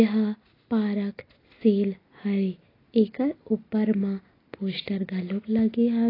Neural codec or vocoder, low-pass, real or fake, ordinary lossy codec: none; 5.4 kHz; real; AAC, 24 kbps